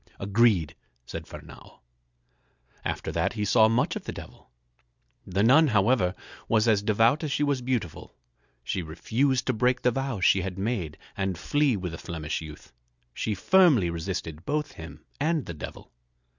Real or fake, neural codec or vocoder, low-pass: real; none; 7.2 kHz